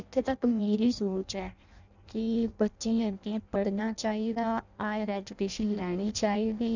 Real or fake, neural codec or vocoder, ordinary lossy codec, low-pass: fake; codec, 16 kHz in and 24 kHz out, 0.6 kbps, FireRedTTS-2 codec; none; 7.2 kHz